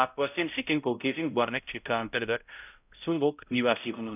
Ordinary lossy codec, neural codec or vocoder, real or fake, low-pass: none; codec, 16 kHz, 0.5 kbps, X-Codec, HuBERT features, trained on balanced general audio; fake; 3.6 kHz